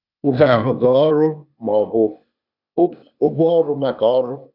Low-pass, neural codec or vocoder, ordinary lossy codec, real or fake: 5.4 kHz; codec, 16 kHz, 0.8 kbps, ZipCodec; none; fake